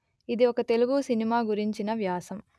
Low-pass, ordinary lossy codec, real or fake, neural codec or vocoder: none; none; real; none